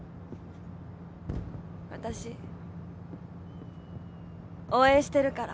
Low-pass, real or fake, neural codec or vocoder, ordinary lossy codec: none; real; none; none